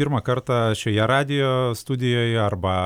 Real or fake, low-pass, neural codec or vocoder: real; 19.8 kHz; none